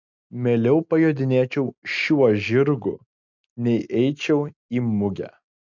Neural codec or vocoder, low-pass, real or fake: none; 7.2 kHz; real